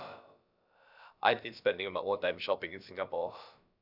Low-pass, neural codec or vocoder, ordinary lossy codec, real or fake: 5.4 kHz; codec, 16 kHz, about 1 kbps, DyCAST, with the encoder's durations; none; fake